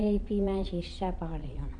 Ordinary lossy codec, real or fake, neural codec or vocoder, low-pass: MP3, 48 kbps; fake; vocoder, 22.05 kHz, 80 mel bands, Vocos; 9.9 kHz